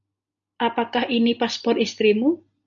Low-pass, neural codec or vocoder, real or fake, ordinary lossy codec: 7.2 kHz; none; real; MP3, 64 kbps